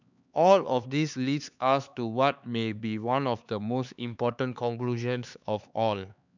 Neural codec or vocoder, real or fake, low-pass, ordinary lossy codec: codec, 16 kHz, 4 kbps, X-Codec, HuBERT features, trained on LibriSpeech; fake; 7.2 kHz; none